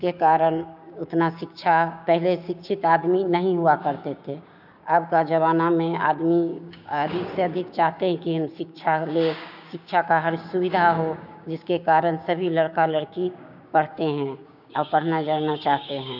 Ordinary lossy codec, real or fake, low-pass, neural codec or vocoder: none; fake; 5.4 kHz; codec, 16 kHz, 6 kbps, DAC